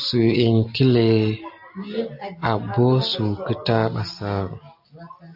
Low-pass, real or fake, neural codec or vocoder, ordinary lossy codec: 5.4 kHz; real; none; AAC, 48 kbps